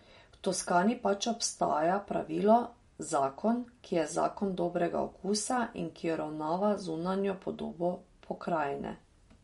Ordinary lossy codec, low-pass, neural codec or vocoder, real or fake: MP3, 48 kbps; 19.8 kHz; none; real